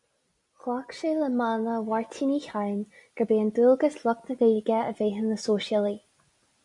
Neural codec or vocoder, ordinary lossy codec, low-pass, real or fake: none; MP3, 96 kbps; 10.8 kHz; real